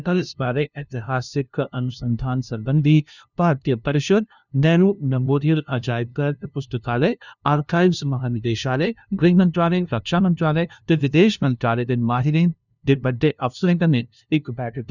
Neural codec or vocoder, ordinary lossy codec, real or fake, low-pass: codec, 16 kHz, 0.5 kbps, FunCodec, trained on LibriTTS, 25 frames a second; none; fake; 7.2 kHz